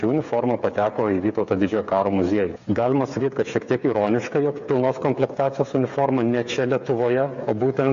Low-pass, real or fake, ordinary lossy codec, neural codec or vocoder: 7.2 kHz; fake; AAC, 64 kbps; codec, 16 kHz, 8 kbps, FreqCodec, smaller model